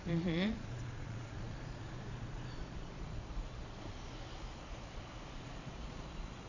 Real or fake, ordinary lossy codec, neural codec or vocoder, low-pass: fake; none; vocoder, 22.05 kHz, 80 mel bands, Vocos; 7.2 kHz